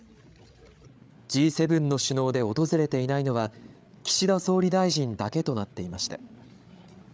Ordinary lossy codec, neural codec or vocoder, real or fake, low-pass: none; codec, 16 kHz, 8 kbps, FreqCodec, larger model; fake; none